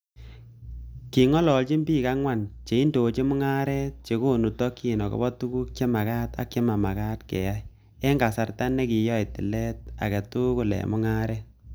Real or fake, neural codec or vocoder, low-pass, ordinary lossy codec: real; none; none; none